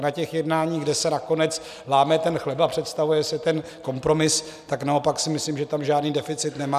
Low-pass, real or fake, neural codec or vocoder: 14.4 kHz; real; none